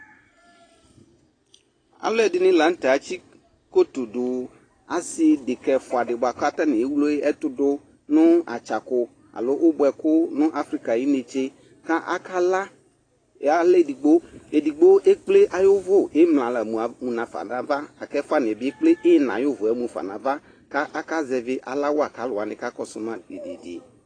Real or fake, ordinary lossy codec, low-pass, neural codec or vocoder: real; AAC, 32 kbps; 9.9 kHz; none